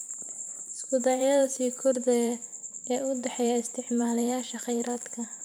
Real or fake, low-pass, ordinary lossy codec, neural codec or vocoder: fake; none; none; vocoder, 44.1 kHz, 128 mel bands every 512 samples, BigVGAN v2